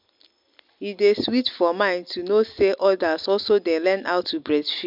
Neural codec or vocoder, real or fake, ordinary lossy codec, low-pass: none; real; MP3, 48 kbps; 5.4 kHz